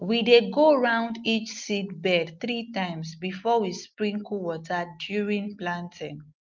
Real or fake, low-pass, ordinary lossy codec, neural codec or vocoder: real; 7.2 kHz; Opus, 24 kbps; none